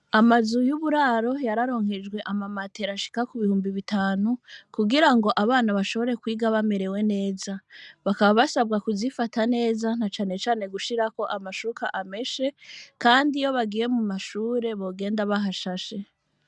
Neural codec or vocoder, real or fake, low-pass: none; real; 10.8 kHz